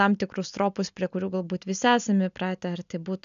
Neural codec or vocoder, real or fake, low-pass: none; real; 7.2 kHz